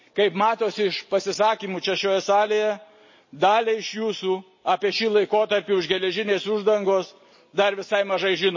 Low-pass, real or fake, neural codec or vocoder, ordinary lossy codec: 7.2 kHz; real; none; MP3, 32 kbps